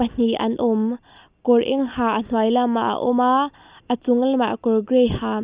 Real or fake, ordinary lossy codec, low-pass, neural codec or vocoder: real; Opus, 64 kbps; 3.6 kHz; none